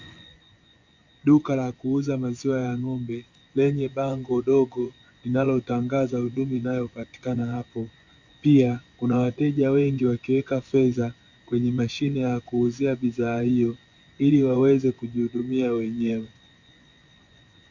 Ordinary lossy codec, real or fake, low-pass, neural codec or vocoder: MP3, 64 kbps; fake; 7.2 kHz; vocoder, 24 kHz, 100 mel bands, Vocos